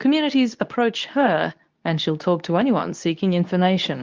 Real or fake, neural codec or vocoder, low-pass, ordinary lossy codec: fake; codec, 24 kHz, 0.9 kbps, WavTokenizer, medium speech release version 2; 7.2 kHz; Opus, 24 kbps